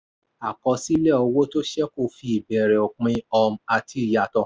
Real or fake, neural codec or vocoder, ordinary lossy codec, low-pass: real; none; none; none